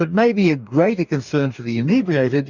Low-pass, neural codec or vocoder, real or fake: 7.2 kHz; codec, 44.1 kHz, 2.6 kbps, DAC; fake